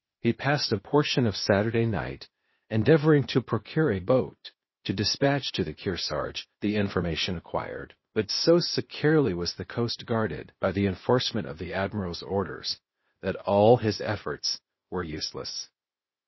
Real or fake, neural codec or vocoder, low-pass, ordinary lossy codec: fake; codec, 16 kHz, 0.8 kbps, ZipCodec; 7.2 kHz; MP3, 24 kbps